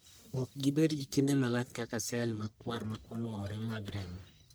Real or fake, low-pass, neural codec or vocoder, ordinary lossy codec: fake; none; codec, 44.1 kHz, 1.7 kbps, Pupu-Codec; none